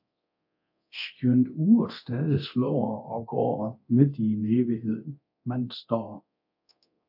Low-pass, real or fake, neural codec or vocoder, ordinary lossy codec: 5.4 kHz; fake; codec, 24 kHz, 0.9 kbps, DualCodec; AAC, 48 kbps